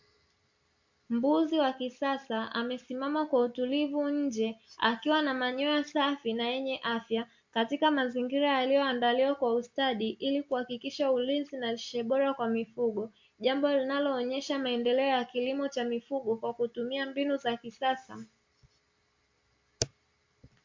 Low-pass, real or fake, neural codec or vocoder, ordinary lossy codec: 7.2 kHz; real; none; MP3, 48 kbps